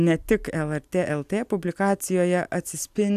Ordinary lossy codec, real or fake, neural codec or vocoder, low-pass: AAC, 96 kbps; real; none; 14.4 kHz